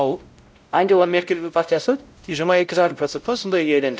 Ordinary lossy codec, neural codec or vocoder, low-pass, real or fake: none; codec, 16 kHz, 0.5 kbps, X-Codec, WavLM features, trained on Multilingual LibriSpeech; none; fake